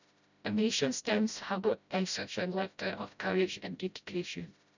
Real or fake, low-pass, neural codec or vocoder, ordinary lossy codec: fake; 7.2 kHz; codec, 16 kHz, 0.5 kbps, FreqCodec, smaller model; none